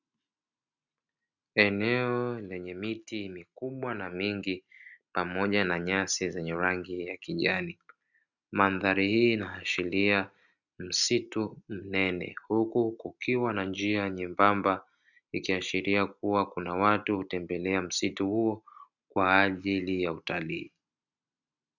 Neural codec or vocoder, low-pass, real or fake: none; 7.2 kHz; real